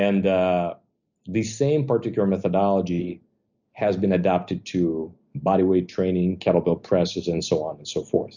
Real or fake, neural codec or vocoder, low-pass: real; none; 7.2 kHz